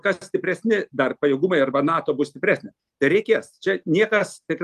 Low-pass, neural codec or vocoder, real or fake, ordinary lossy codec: 14.4 kHz; none; real; Opus, 32 kbps